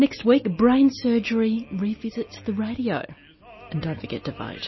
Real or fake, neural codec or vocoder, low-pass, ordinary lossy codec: real; none; 7.2 kHz; MP3, 24 kbps